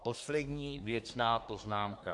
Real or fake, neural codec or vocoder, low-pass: fake; codec, 24 kHz, 1 kbps, SNAC; 10.8 kHz